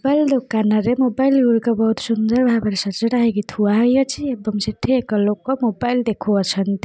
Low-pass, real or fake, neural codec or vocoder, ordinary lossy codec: none; real; none; none